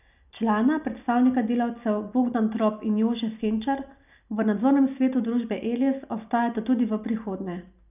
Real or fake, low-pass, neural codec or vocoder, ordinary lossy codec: real; 3.6 kHz; none; none